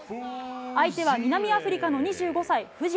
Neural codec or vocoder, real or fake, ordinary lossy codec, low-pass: none; real; none; none